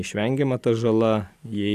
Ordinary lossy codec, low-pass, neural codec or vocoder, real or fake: AAC, 96 kbps; 14.4 kHz; none; real